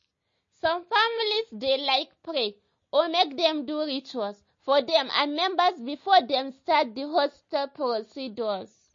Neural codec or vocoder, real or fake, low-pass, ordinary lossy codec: none; real; 7.2 kHz; MP3, 32 kbps